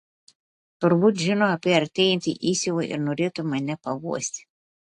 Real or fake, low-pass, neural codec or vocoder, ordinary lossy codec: real; 10.8 kHz; none; AAC, 48 kbps